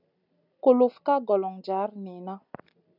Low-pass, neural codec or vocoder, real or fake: 5.4 kHz; none; real